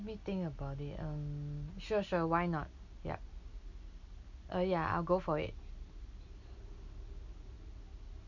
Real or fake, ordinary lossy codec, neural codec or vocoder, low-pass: real; none; none; 7.2 kHz